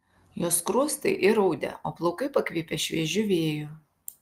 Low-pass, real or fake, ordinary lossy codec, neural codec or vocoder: 14.4 kHz; real; Opus, 32 kbps; none